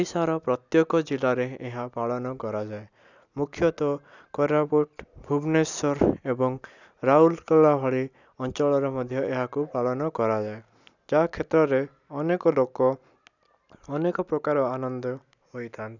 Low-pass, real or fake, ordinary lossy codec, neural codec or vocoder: 7.2 kHz; real; none; none